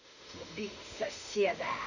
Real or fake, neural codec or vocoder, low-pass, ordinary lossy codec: fake; autoencoder, 48 kHz, 32 numbers a frame, DAC-VAE, trained on Japanese speech; 7.2 kHz; none